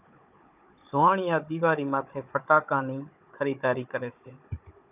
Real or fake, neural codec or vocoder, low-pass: fake; codec, 16 kHz, 16 kbps, FunCodec, trained on Chinese and English, 50 frames a second; 3.6 kHz